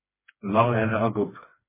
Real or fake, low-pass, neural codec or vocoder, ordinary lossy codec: fake; 3.6 kHz; codec, 16 kHz, 2 kbps, FreqCodec, smaller model; MP3, 16 kbps